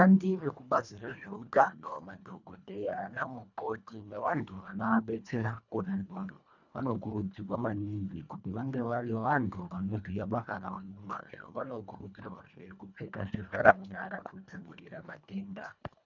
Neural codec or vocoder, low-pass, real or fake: codec, 24 kHz, 1.5 kbps, HILCodec; 7.2 kHz; fake